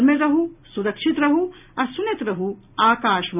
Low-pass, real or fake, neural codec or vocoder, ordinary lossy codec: 3.6 kHz; real; none; none